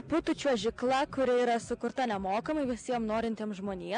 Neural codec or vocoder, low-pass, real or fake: none; 9.9 kHz; real